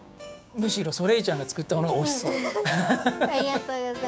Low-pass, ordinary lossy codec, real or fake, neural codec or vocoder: none; none; fake; codec, 16 kHz, 6 kbps, DAC